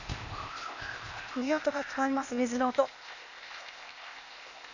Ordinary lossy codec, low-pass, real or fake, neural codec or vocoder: none; 7.2 kHz; fake; codec, 16 kHz, 0.8 kbps, ZipCodec